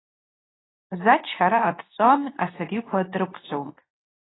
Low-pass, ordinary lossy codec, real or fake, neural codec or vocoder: 7.2 kHz; AAC, 16 kbps; fake; codec, 24 kHz, 0.9 kbps, WavTokenizer, medium speech release version 2